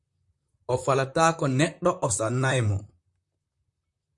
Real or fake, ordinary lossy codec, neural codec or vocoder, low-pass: fake; MP3, 64 kbps; vocoder, 44.1 kHz, 128 mel bands, Pupu-Vocoder; 10.8 kHz